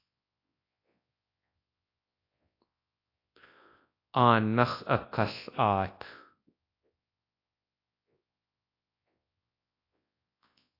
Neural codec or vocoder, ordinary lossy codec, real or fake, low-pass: codec, 24 kHz, 0.9 kbps, WavTokenizer, large speech release; AAC, 32 kbps; fake; 5.4 kHz